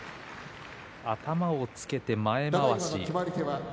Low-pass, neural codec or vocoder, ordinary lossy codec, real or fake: none; none; none; real